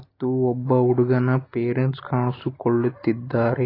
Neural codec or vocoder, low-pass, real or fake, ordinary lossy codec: none; 5.4 kHz; real; AAC, 24 kbps